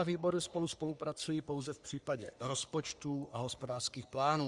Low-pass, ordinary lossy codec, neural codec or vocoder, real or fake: 10.8 kHz; Opus, 64 kbps; codec, 44.1 kHz, 3.4 kbps, Pupu-Codec; fake